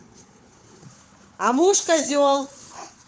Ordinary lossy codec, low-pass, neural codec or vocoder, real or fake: none; none; codec, 16 kHz, 4 kbps, FunCodec, trained on Chinese and English, 50 frames a second; fake